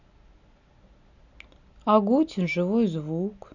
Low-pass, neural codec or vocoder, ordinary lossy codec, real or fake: 7.2 kHz; none; none; real